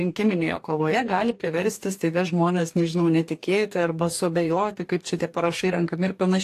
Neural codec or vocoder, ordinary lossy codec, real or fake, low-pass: codec, 44.1 kHz, 2.6 kbps, DAC; AAC, 64 kbps; fake; 14.4 kHz